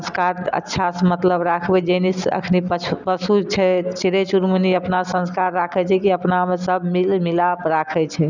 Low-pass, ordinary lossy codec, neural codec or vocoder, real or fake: 7.2 kHz; none; none; real